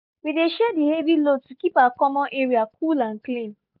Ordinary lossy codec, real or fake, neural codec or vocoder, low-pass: none; real; none; 5.4 kHz